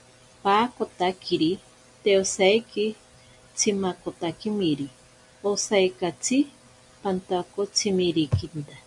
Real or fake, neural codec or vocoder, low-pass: real; none; 10.8 kHz